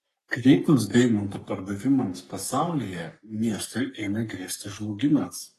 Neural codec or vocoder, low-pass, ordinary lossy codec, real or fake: codec, 44.1 kHz, 3.4 kbps, Pupu-Codec; 14.4 kHz; AAC, 48 kbps; fake